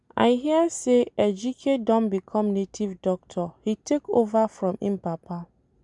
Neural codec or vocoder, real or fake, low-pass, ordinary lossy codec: none; real; 10.8 kHz; none